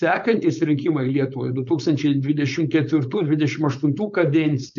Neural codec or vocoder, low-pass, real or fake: codec, 16 kHz, 4.8 kbps, FACodec; 7.2 kHz; fake